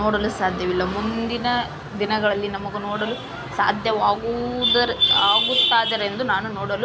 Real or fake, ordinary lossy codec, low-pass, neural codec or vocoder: real; none; none; none